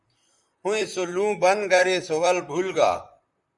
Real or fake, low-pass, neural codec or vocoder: fake; 10.8 kHz; vocoder, 44.1 kHz, 128 mel bands, Pupu-Vocoder